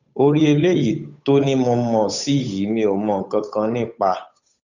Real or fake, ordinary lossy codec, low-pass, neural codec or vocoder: fake; none; 7.2 kHz; codec, 16 kHz, 8 kbps, FunCodec, trained on Chinese and English, 25 frames a second